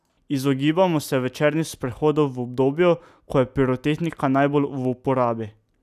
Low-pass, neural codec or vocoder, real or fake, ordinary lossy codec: 14.4 kHz; none; real; none